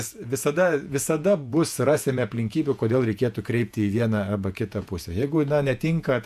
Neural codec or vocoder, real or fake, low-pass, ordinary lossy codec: vocoder, 48 kHz, 128 mel bands, Vocos; fake; 14.4 kHz; AAC, 96 kbps